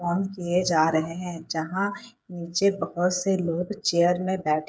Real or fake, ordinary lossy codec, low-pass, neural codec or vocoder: fake; none; none; codec, 16 kHz, 8 kbps, FreqCodec, smaller model